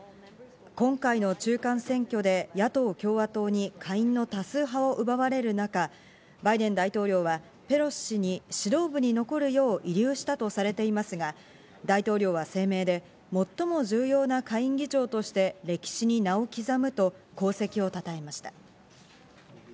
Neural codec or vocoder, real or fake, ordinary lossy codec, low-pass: none; real; none; none